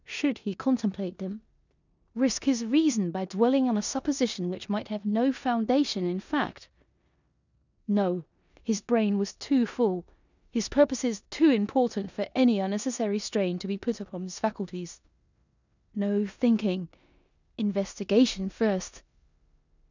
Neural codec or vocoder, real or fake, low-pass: codec, 16 kHz in and 24 kHz out, 0.9 kbps, LongCat-Audio-Codec, four codebook decoder; fake; 7.2 kHz